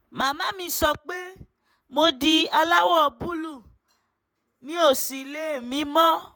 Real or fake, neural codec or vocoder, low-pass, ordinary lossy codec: fake; vocoder, 48 kHz, 128 mel bands, Vocos; none; none